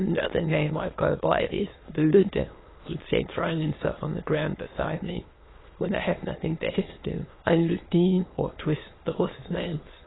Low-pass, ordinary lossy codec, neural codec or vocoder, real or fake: 7.2 kHz; AAC, 16 kbps; autoencoder, 22.05 kHz, a latent of 192 numbers a frame, VITS, trained on many speakers; fake